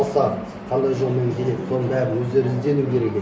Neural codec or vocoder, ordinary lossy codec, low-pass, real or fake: none; none; none; real